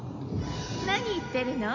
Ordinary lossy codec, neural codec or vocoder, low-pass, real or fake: MP3, 48 kbps; none; 7.2 kHz; real